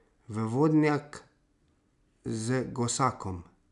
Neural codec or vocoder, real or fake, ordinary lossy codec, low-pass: none; real; none; 10.8 kHz